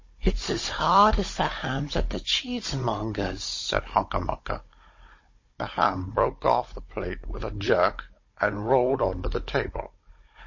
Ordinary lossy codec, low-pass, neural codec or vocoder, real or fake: MP3, 32 kbps; 7.2 kHz; codec, 16 kHz, 16 kbps, FunCodec, trained on Chinese and English, 50 frames a second; fake